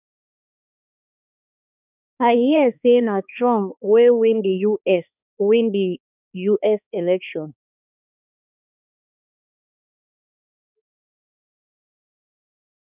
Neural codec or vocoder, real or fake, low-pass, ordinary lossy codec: codec, 16 kHz, 2 kbps, X-Codec, HuBERT features, trained on balanced general audio; fake; 3.6 kHz; none